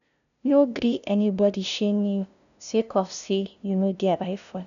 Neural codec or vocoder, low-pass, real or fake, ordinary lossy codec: codec, 16 kHz, 0.5 kbps, FunCodec, trained on LibriTTS, 25 frames a second; 7.2 kHz; fake; none